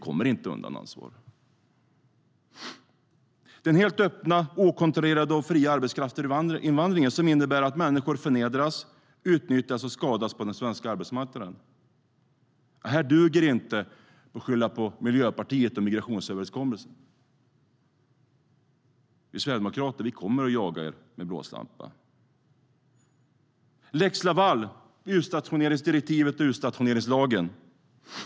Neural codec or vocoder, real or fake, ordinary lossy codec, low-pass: none; real; none; none